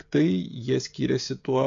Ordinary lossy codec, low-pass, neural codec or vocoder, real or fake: MP3, 48 kbps; 7.2 kHz; codec, 16 kHz, 16 kbps, FreqCodec, smaller model; fake